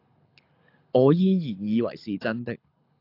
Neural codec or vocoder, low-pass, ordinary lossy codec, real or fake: codec, 24 kHz, 6 kbps, HILCodec; 5.4 kHz; AAC, 32 kbps; fake